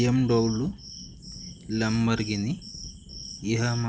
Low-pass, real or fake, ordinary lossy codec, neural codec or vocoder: none; real; none; none